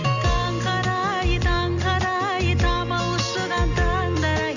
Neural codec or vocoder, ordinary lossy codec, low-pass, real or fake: none; none; 7.2 kHz; real